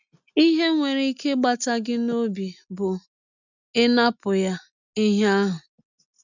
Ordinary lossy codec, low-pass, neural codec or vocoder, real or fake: none; 7.2 kHz; none; real